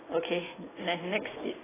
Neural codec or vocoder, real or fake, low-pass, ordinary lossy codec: none; real; 3.6 kHz; AAC, 16 kbps